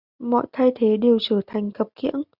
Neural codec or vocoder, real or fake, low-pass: none; real; 5.4 kHz